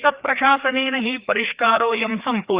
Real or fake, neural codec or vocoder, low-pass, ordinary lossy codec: fake; codec, 16 kHz, 2 kbps, FreqCodec, larger model; 3.6 kHz; Opus, 64 kbps